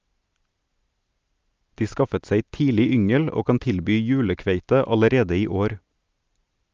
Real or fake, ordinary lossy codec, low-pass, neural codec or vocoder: real; Opus, 24 kbps; 7.2 kHz; none